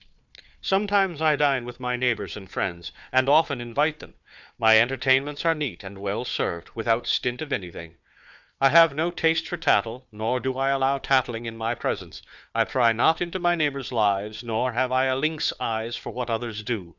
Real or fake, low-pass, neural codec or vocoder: fake; 7.2 kHz; codec, 16 kHz, 4 kbps, FunCodec, trained on Chinese and English, 50 frames a second